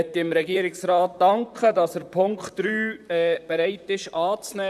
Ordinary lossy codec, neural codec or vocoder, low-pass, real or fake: none; vocoder, 44.1 kHz, 128 mel bands, Pupu-Vocoder; 14.4 kHz; fake